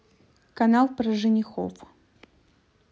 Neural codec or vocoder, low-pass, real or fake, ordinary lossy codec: none; none; real; none